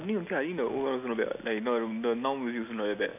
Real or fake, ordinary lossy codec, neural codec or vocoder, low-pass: real; none; none; 3.6 kHz